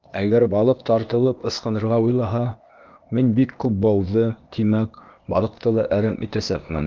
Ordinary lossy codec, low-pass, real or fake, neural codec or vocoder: Opus, 32 kbps; 7.2 kHz; fake; codec, 16 kHz, 0.8 kbps, ZipCodec